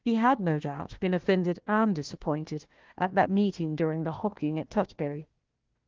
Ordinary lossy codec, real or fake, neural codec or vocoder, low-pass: Opus, 16 kbps; fake; codec, 16 kHz, 1 kbps, FunCodec, trained on Chinese and English, 50 frames a second; 7.2 kHz